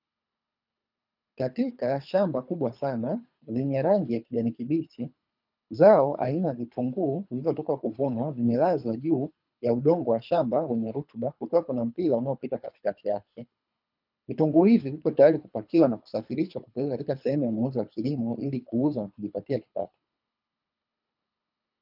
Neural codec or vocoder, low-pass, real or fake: codec, 24 kHz, 3 kbps, HILCodec; 5.4 kHz; fake